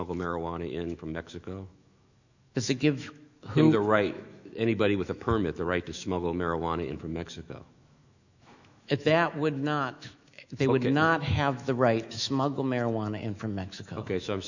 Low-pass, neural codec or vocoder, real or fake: 7.2 kHz; autoencoder, 48 kHz, 128 numbers a frame, DAC-VAE, trained on Japanese speech; fake